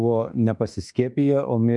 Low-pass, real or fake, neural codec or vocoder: 10.8 kHz; fake; autoencoder, 48 kHz, 32 numbers a frame, DAC-VAE, trained on Japanese speech